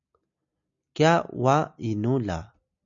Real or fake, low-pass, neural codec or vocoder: real; 7.2 kHz; none